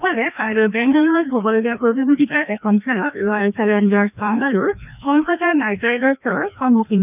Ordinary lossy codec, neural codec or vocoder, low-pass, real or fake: none; codec, 16 kHz, 1 kbps, FreqCodec, larger model; 3.6 kHz; fake